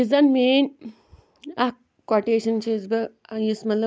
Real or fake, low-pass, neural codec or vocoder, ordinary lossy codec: real; none; none; none